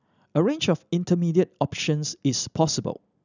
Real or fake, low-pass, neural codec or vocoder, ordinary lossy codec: real; 7.2 kHz; none; none